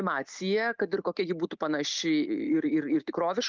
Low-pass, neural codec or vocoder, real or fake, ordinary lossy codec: 7.2 kHz; none; real; Opus, 32 kbps